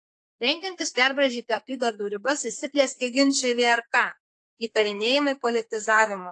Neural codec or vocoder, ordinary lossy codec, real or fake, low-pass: codec, 32 kHz, 1.9 kbps, SNAC; AAC, 48 kbps; fake; 10.8 kHz